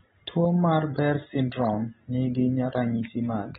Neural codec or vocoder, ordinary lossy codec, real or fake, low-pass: none; AAC, 16 kbps; real; 10.8 kHz